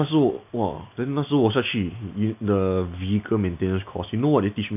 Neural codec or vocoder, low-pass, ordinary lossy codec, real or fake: none; 3.6 kHz; none; real